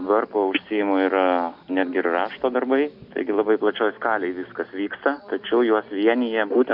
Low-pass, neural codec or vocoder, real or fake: 5.4 kHz; none; real